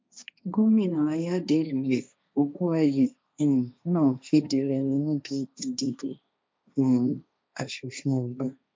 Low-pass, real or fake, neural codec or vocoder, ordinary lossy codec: none; fake; codec, 16 kHz, 1.1 kbps, Voila-Tokenizer; none